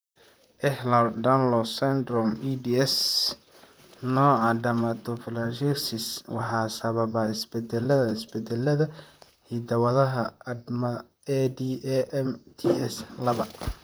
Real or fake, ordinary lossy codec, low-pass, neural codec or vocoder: fake; none; none; vocoder, 44.1 kHz, 128 mel bands, Pupu-Vocoder